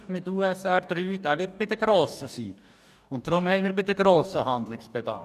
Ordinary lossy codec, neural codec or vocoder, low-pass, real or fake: none; codec, 44.1 kHz, 2.6 kbps, DAC; 14.4 kHz; fake